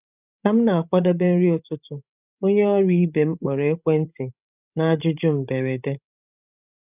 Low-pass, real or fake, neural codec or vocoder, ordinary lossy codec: 3.6 kHz; fake; codec, 16 kHz, 16 kbps, FreqCodec, larger model; none